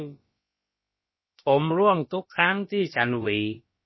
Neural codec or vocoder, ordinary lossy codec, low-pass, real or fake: codec, 16 kHz, about 1 kbps, DyCAST, with the encoder's durations; MP3, 24 kbps; 7.2 kHz; fake